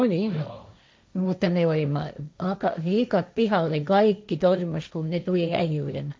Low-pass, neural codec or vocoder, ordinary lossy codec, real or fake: none; codec, 16 kHz, 1.1 kbps, Voila-Tokenizer; none; fake